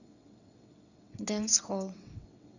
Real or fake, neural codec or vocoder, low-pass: fake; vocoder, 22.05 kHz, 80 mel bands, WaveNeXt; 7.2 kHz